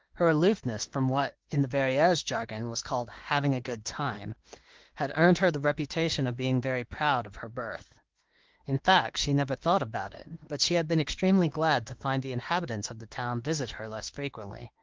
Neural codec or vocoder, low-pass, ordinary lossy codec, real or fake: autoencoder, 48 kHz, 32 numbers a frame, DAC-VAE, trained on Japanese speech; 7.2 kHz; Opus, 16 kbps; fake